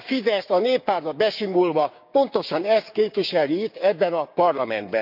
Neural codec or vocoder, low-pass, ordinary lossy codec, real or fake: codec, 16 kHz, 6 kbps, DAC; 5.4 kHz; none; fake